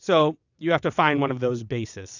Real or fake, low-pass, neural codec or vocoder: fake; 7.2 kHz; vocoder, 22.05 kHz, 80 mel bands, WaveNeXt